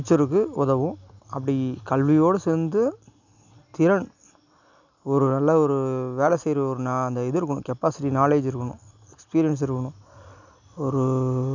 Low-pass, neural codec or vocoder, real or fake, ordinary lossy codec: 7.2 kHz; none; real; none